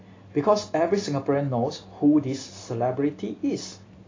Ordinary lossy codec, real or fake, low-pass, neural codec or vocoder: AAC, 32 kbps; real; 7.2 kHz; none